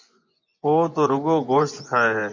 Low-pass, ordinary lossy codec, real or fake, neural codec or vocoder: 7.2 kHz; MP3, 48 kbps; fake; vocoder, 24 kHz, 100 mel bands, Vocos